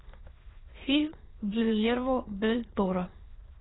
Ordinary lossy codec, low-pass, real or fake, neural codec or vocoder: AAC, 16 kbps; 7.2 kHz; fake; autoencoder, 22.05 kHz, a latent of 192 numbers a frame, VITS, trained on many speakers